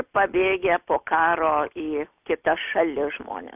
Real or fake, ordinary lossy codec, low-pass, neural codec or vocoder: real; AAC, 32 kbps; 3.6 kHz; none